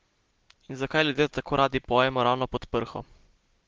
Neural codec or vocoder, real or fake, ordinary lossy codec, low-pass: none; real; Opus, 16 kbps; 7.2 kHz